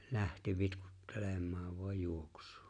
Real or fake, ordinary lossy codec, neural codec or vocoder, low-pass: real; none; none; 9.9 kHz